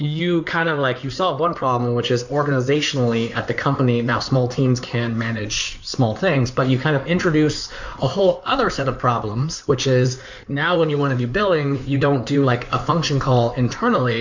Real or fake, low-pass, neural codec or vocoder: fake; 7.2 kHz; codec, 16 kHz in and 24 kHz out, 2.2 kbps, FireRedTTS-2 codec